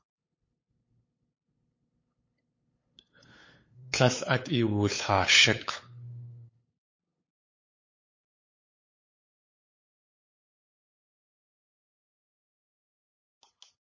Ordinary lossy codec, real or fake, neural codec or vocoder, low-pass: MP3, 32 kbps; fake; codec, 16 kHz, 8 kbps, FunCodec, trained on LibriTTS, 25 frames a second; 7.2 kHz